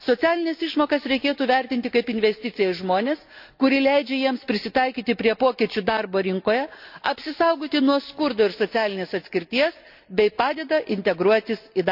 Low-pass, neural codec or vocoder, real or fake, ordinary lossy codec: 5.4 kHz; none; real; none